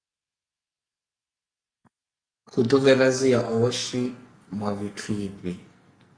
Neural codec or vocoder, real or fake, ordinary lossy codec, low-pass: codec, 44.1 kHz, 2.6 kbps, SNAC; fake; Opus, 64 kbps; 9.9 kHz